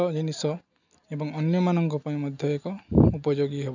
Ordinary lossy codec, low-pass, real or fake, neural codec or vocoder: none; 7.2 kHz; real; none